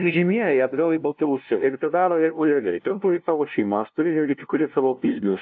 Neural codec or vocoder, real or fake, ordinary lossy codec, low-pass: codec, 16 kHz, 0.5 kbps, FunCodec, trained on LibriTTS, 25 frames a second; fake; AAC, 48 kbps; 7.2 kHz